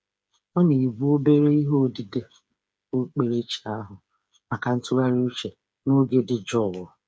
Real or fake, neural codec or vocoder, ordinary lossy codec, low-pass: fake; codec, 16 kHz, 8 kbps, FreqCodec, smaller model; none; none